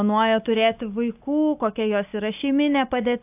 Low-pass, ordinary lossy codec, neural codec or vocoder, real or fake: 3.6 kHz; AAC, 32 kbps; none; real